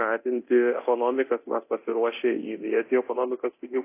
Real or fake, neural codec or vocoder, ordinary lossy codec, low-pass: fake; codec, 24 kHz, 0.9 kbps, DualCodec; AAC, 24 kbps; 3.6 kHz